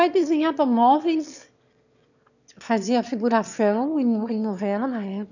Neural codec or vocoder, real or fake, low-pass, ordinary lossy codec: autoencoder, 22.05 kHz, a latent of 192 numbers a frame, VITS, trained on one speaker; fake; 7.2 kHz; none